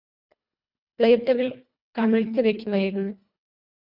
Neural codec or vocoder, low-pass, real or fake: codec, 24 kHz, 1.5 kbps, HILCodec; 5.4 kHz; fake